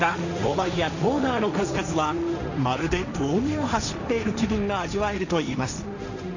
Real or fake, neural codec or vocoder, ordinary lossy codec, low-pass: fake; codec, 16 kHz, 1.1 kbps, Voila-Tokenizer; none; 7.2 kHz